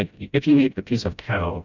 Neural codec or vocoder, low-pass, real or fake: codec, 16 kHz, 0.5 kbps, FreqCodec, smaller model; 7.2 kHz; fake